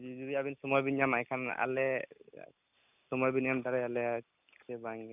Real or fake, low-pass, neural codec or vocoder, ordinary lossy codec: real; 3.6 kHz; none; none